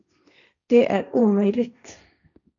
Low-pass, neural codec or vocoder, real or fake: 7.2 kHz; codec, 16 kHz, 1.1 kbps, Voila-Tokenizer; fake